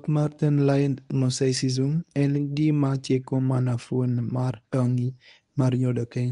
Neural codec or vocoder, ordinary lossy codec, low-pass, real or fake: codec, 24 kHz, 0.9 kbps, WavTokenizer, medium speech release version 1; none; 10.8 kHz; fake